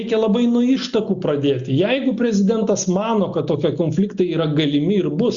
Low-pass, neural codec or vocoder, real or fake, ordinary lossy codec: 7.2 kHz; none; real; Opus, 64 kbps